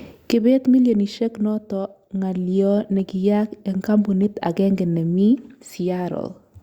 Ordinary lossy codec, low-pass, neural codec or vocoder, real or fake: Opus, 64 kbps; 19.8 kHz; none; real